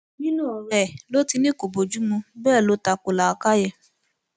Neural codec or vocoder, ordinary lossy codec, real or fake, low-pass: none; none; real; none